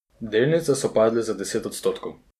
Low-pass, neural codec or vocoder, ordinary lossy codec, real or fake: 14.4 kHz; none; none; real